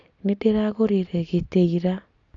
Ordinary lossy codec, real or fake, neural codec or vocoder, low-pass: AAC, 64 kbps; real; none; 7.2 kHz